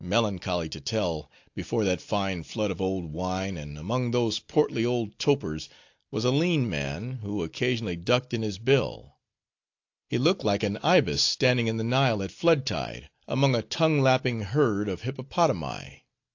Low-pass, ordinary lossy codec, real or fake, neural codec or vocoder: 7.2 kHz; AAC, 48 kbps; real; none